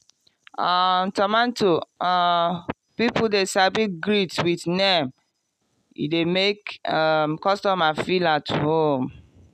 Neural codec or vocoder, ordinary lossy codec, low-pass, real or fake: none; none; 14.4 kHz; real